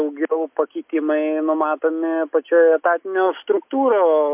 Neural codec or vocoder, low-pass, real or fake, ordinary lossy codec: none; 3.6 kHz; real; MP3, 32 kbps